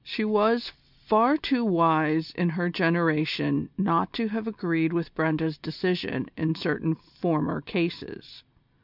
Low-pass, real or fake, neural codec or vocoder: 5.4 kHz; real; none